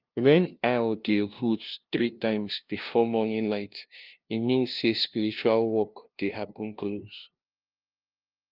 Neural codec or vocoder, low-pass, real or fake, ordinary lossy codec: codec, 16 kHz, 0.5 kbps, FunCodec, trained on LibriTTS, 25 frames a second; 5.4 kHz; fake; Opus, 24 kbps